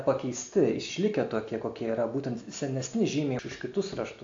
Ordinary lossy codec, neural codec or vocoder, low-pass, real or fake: AAC, 48 kbps; none; 7.2 kHz; real